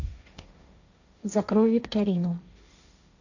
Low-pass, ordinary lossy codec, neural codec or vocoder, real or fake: 7.2 kHz; none; codec, 16 kHz, 1.1 kbps, Voila-Tokenizer; fake